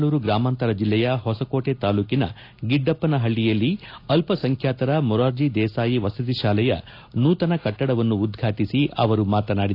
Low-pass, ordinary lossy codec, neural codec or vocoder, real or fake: 5.4 kHz; AAC, 32 kbps; none; real